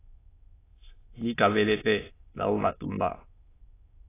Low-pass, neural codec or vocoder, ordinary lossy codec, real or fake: 3.6 kHz; autoencoder, 22.05 kHz, a latent of 192 numbers a frame, VITS, trained on many speakers; AAC, 16 kbps; fake